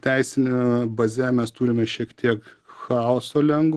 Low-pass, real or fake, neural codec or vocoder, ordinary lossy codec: 10.8 kHz; real; none; Opus, 16 kbps